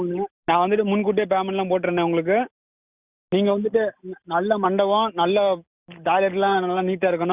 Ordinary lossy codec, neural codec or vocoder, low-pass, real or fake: Opus, 64 kbps; none; 3.6 kHz; real